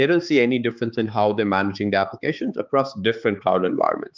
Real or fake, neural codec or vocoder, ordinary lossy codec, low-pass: fake; codec, 16 kHz, 4 kbps, X-Codec, HuBERT features, trained on balanced general audio; Opus, 24 kbps; 7.2 kHz